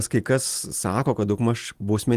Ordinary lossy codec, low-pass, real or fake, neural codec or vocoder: Opus, 24 kbps; 14.4 kHz; real; none